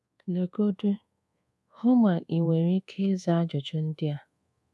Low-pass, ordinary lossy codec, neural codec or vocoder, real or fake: none; none; codec, 24 kHz, 1.2 kbps, DualCodec; fake